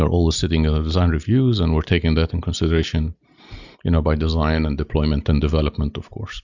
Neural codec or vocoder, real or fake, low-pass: none; real; 7.2 kHz